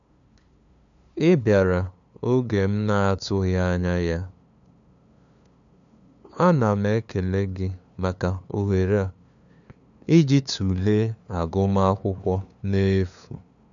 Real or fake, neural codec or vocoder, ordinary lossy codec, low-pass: fake; codec, 16 kHz, 2 kbps, FunCodec, trained on LibriTTS, 25 frames a second; none; 7.2 kHz